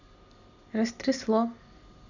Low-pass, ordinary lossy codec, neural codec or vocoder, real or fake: 7.2 kHz; none; none; real